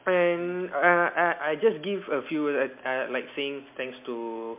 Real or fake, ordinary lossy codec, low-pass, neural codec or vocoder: real; MP3, 32 kbps; 3.6 kHz; none